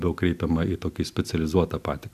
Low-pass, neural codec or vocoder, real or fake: 14.4 kHz; none; real